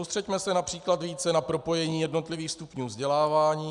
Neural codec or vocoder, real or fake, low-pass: none; real; 10.8 kHz